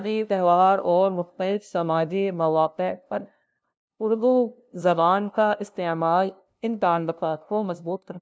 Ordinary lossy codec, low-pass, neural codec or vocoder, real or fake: none; none; codec, 16 kHz, 0.5 kbps, FunCodec, trained on LibriTTS, 25 frames a second; fake